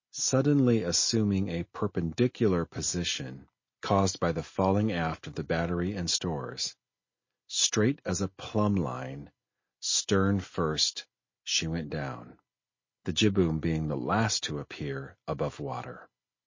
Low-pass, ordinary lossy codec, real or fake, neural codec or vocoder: 7.2 kHz; MP3, 32 kbps; real; none